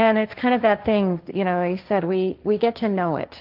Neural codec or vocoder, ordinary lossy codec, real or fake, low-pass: codec, 16 kHz, 1.1 kbps, Voila-Tokenizer; Opus, 32 kbps; fake; 5.4 kHz